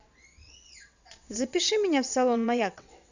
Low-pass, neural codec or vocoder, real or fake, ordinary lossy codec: 7.2 kHz; vocoder, 22.05 kHz, 80 mel bands, WaveNeXt; fake; none